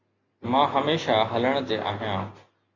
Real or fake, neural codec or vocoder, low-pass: real; none; 7.2 kHz